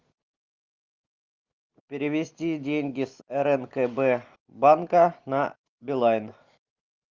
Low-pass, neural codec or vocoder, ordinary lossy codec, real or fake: 7.2 kHz; none; Opus, 24 kbps; real